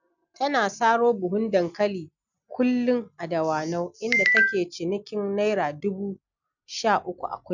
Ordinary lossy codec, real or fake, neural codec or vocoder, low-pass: none; real; none; 7.2 kHz